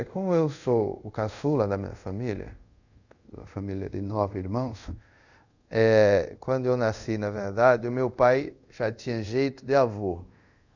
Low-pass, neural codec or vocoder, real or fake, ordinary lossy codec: 7.2 kHz; codec, 24 kHz, 0.5 kbps, DualCodec; fake; none